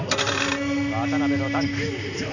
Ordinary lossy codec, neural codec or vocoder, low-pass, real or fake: none; none; 7.2 kHz; real